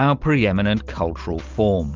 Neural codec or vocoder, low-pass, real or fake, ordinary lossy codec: none; 7.2 kHz; real; Opus, 32 kbps